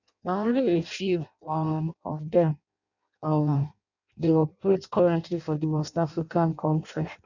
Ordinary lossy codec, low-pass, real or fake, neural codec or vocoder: none; 7.2 kHz; fake; codec, 16 kHz in and 24 kHz out, 0.6 kbps, FireRedTTS-2 codec